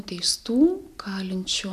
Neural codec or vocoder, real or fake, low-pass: none; real; 14.4 kHz